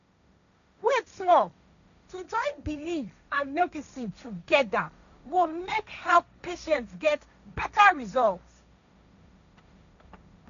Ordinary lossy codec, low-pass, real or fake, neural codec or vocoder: none; 7.2 kHz; fake; codec, 16 kHz, 1.1 kbps, Voila-Tokenizer